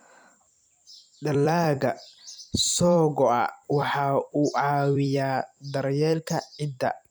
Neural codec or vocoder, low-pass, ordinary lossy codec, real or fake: vocoder, 44.1 kHz, 128 mel bands every 256 samples, BigVGAN v2; none; none; fake